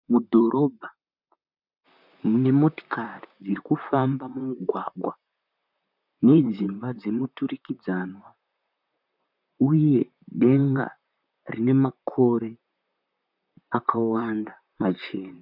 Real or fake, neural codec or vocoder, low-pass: fake; vocoder, 44.1 kHz, 128 mel bands, Pupu-Vocoder; 5.4 kHz